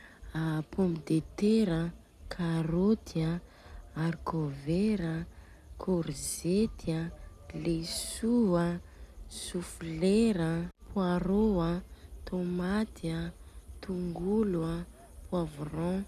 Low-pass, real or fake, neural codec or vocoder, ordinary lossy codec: 14.4 kHz; real; none; none